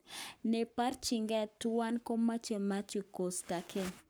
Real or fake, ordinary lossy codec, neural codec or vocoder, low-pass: fake; none; codec, 44.1 kHz, 7.8 kbps, Pupu-Codec; none